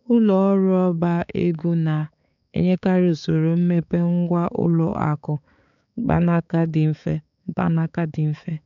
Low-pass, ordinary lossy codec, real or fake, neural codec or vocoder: 7.2 kHz; none; fake; codec, 16 kHz, 4 kbps, X-Codec, HuBERT features, trained on balanced general audio